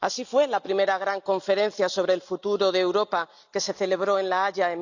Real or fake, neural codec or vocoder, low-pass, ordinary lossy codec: real; none; 7.2 kHz; none